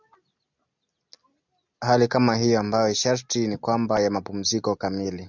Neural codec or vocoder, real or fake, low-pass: none; real; 7.2 kHz